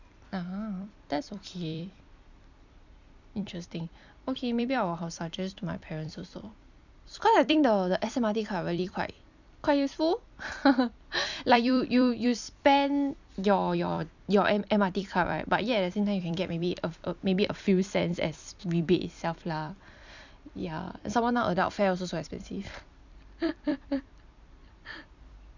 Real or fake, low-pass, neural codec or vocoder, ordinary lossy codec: fake; 7.2 kHz; vocoder, 44.1 kHz, 128 mel bands every 256 samples, BigVGAN v2; none